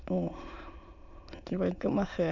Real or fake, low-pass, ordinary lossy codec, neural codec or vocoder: fake; 7.2 kHz; none; autoencoder, 22.05 kHz, a latent of 192 numbers a frame, VITS, trained on many speakers